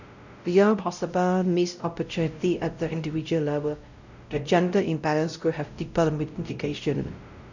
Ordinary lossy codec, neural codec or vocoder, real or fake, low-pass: none; codec, 16 kHz, 0.5 kbps, X-Codec, WavLM features, trained on Multilingual LibriSpeech; fake; 7.2 kHz